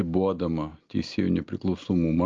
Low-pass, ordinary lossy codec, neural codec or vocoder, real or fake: 7.2 kHz; Opus, 32 kbps; none; real